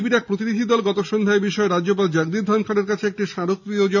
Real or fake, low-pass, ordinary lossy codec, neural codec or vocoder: real; 7.2 kHz; none; none